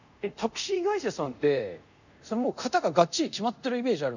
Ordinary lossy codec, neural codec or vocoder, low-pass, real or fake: none; codec, 24 kHz, 0.5 kbps, DualCodec; 7.2 kHz; fake